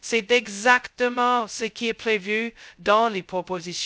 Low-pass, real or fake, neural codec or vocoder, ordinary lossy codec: none; fake; codec, 16 kHz, 0.2 kbps, FocalCodec; none